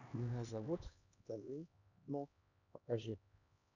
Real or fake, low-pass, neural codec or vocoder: fake; 7.2 kHz; codec, 16 kHz, 2 kbps, X-Codec, HuBERT features, trained on LibriSpeech